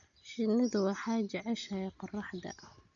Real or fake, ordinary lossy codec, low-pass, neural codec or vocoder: real; none; 7.2 kHz; none